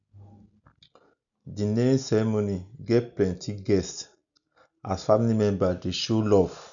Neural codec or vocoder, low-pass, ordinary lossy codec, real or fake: none; 7.2 kHz; none; real